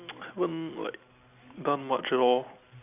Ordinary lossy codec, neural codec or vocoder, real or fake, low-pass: none; none; real; 3.6 kHz